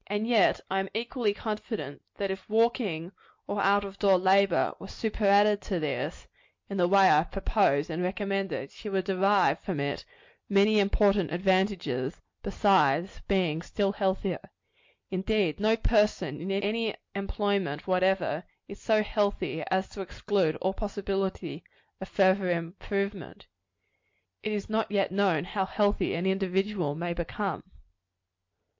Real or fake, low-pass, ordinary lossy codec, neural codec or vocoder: real; 7.2 kHz; MP3, 48 kbps; none